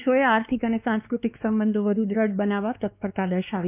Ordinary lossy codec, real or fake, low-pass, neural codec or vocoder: none; fake; 3.6 kHz; codec, 16 kHz, 2 kbps, X-Codec, WavLM features, trained on Multilingual LibriSpeech